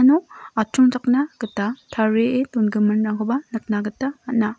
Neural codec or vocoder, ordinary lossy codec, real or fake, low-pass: none; none; real; none